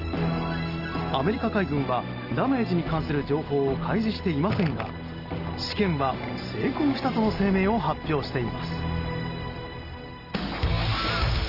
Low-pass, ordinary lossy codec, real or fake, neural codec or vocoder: 5.4 kHz; Opus, 24 kbps; real; none